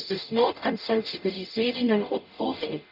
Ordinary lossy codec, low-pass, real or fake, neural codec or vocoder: none; 5.4 kHz; fake; codec, 44.1 kHz, 0.9 kbps, DAC